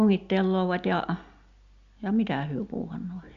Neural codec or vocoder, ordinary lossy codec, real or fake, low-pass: none; Opus, 64 kbps; real; 7.2 kHz